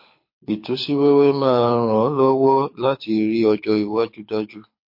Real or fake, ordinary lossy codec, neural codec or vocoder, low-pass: fake; MP3, 32 kbps; codec, 24 kHz, 6 kbps, HILCodec; 5.4 kHz